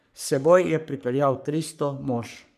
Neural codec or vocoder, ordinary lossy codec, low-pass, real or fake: codec, 44.1 kHz, 3.4 kbps, Pupu-Codec; none; 14.4 kHz; fake